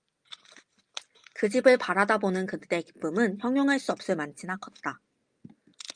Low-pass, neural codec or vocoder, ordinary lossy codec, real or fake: 9.9 kHz; none; Opus, 32 kbps; real